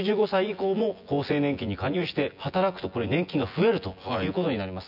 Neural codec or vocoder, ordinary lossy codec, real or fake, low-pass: vocoder, 24 kHz, 100 mel bands, Vocos; none; fake; 5.4 kHz